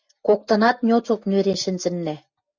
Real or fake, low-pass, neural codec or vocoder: real; 7.2 kHz; none